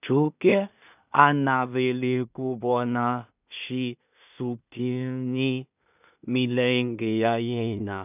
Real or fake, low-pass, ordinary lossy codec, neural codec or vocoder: fake; 3.6 kHz; none; codec, 16 kHz in and 24 kHz out, 0.4 kbps, LongCat-Audio-Codec, two codebook decoder